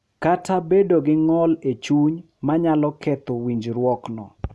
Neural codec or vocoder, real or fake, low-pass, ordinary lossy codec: none; real; none; none